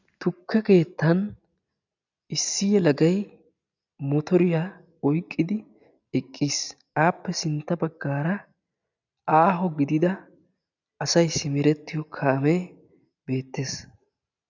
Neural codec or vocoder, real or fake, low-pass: none; real; 7.2 kHz